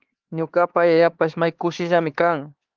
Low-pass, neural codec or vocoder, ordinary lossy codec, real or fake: 7.2 kHz; codec, 16 kHz, 4 kbps, X-Codec, HuBERT features, trained on LibriSpeech; Opus, 16 kbps; fake